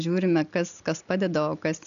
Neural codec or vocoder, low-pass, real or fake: none; 7.2 kHz; real